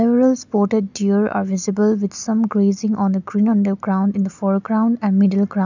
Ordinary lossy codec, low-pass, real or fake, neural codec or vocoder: none; 7.2 kHz; real; none